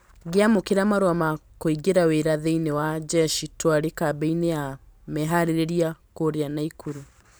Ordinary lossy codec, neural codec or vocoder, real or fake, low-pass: none; vocoder, 44.1 kHz, 128 mel bands every 512 samples, BigVGAN v2; fake; none